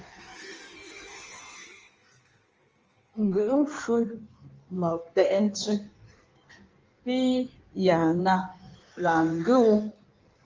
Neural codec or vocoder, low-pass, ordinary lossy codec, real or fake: codec, 16 kHz in and 24 kHz out, 1.1 kbps, FireRedTTS-2 codec; 7.2 kHz; Opus, 24 kbps; fake